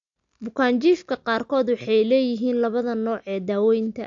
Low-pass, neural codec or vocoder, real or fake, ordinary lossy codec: 7.2 kHz; none; real; AAC, 64 kbps